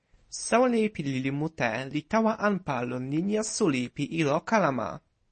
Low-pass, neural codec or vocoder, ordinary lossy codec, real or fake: 10.8 kHz; none; MP3, 32 kbps; real